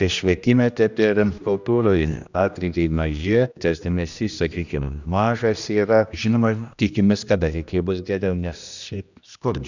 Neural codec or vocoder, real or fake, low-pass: codec, 16 kHz, 1 kbps, X-Codec, HuBERT features, trained on general audio; fake; 7.2 kHz